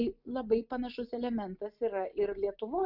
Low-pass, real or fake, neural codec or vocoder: 5.4 kHz; real; none